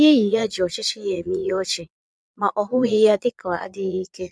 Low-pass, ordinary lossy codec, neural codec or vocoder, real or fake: none; none; vocoder, 22.05 kHz, 80 mel bands, Vocos; fake